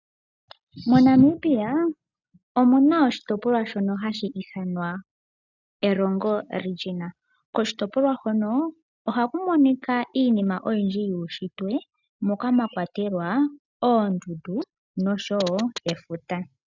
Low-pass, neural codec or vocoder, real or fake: 7.2 kHz; none; real